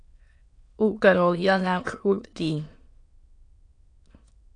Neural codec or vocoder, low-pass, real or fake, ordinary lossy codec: autoencoder, 22.05 kHz, a latent of 192 numbers a frame, VITS, trained on many speakers; 9.9 kHz; fake; AAC, 48 kbps